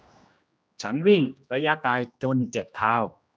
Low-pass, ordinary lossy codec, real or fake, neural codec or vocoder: none; none; fake; codec, 16 kHz, 1 kbps, X-Codec, HuBERT features, trained on general audio